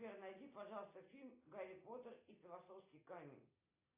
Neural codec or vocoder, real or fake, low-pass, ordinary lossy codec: none; real; 3.6 kHz; AAC, 24 kbps